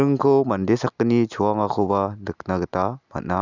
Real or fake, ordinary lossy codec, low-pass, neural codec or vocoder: real; none; 7.2 kHz; none